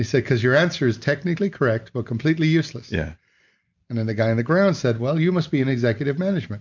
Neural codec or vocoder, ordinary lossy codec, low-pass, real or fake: none; MP3, 64 kbps; 7.2 kHz; real